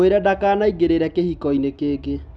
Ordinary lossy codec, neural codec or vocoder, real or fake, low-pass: none; none; real; none